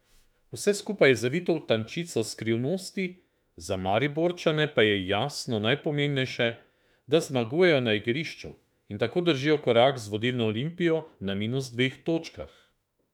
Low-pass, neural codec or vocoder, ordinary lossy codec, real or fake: 19.8 kHz; autoencoder, 48 kHz, 32 numbers a frame, DAC-VAE, trained on Japanese speech; none; fake